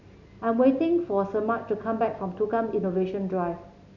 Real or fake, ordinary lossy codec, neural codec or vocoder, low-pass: real; none; none; 7.2 kHz